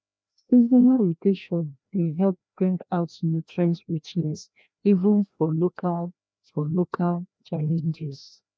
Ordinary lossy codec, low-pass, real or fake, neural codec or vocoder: none; none; fake; codec, 16 kHz, 1 kbps, FreqCodec, larger model